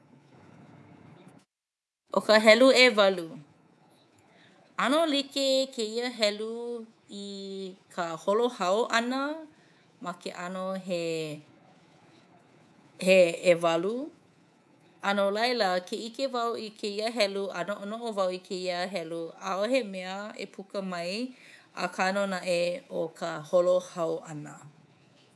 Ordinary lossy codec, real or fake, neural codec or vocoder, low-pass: none; fake; codec, 24 kHz, 3.1 kbps, DualCodec; none